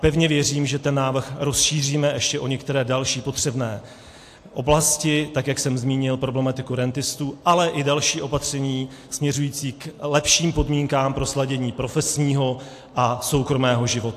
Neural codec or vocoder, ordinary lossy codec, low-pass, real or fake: none; AAC, 64 kbps; 14.4 kHz; real